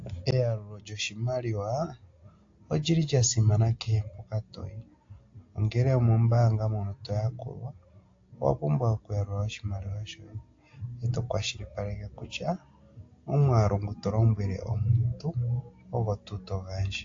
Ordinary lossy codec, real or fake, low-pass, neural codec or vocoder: AAC, 48 kbps; real; 7.2 kHz; none